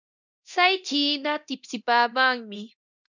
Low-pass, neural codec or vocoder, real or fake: 7.2 kHz; codec, 24 kHz, 0.9 kbps, DualCodec; fake